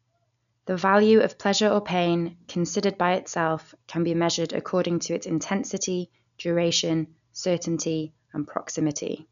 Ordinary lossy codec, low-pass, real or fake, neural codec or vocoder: none; 7.2 kHz; real; none